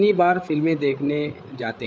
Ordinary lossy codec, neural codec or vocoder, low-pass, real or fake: none; codec, 16 kHz, 8 kbps, FreqCodec, larger model; none; fake